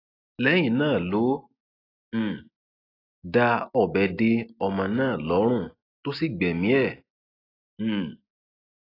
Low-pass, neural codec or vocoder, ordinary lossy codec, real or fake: 5.4 kHz; none; AAC, 24 kbps; real